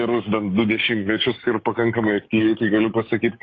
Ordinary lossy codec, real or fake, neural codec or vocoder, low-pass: AAC, 32 kbps; fake; codec, 16 kHz, 6 kbps, DAC; 7.2 kHz